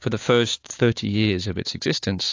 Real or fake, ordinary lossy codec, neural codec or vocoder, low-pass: fake; AAC, 48 kbps; codec, 16 kHz, 6 kbps, DAC; 7.2 kHz